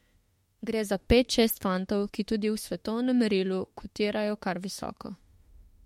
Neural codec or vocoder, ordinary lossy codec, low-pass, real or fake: autoencoder, 48 kHz, 32 numbers a frame, DAC-VAE, trained on Japanese speech; MP3, 64 kbps; 19.8 kHz; fake